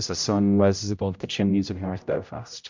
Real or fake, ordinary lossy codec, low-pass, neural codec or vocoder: fake; MP3, 64 kbps; 7.2 kHz; codec, 16 kHz, 0.5 kbps, X-Codec, HuBERT features, trained on general audio